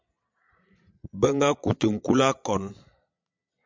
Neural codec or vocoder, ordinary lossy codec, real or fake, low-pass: vocoder, 22.05 kHz, 80 mel bands, Vocos; MP3, 64 kbps; fake; 7.2 kHz